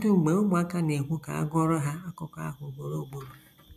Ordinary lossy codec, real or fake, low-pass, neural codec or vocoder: none; real; 14.4 kHz; none